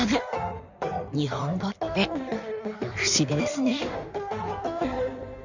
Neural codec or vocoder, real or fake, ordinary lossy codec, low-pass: codec, 16 kHz in and 24 kHz out, 1.1 kbps, FireRedTTS-2 codec; fake; none; 7.2 kHz